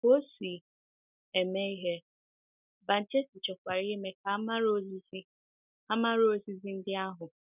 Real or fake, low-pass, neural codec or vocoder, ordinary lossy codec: real; 3.6 kHz; none; none